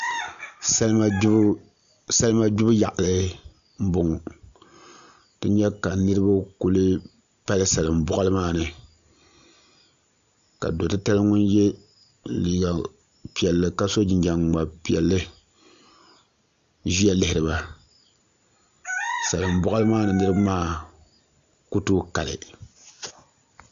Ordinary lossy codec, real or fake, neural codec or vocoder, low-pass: Opus, 64 kbps; real; none; 7.2 kHz